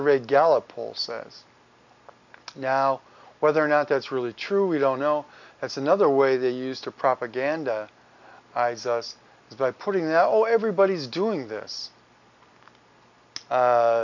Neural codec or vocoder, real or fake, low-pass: none; real; 7.2 kHz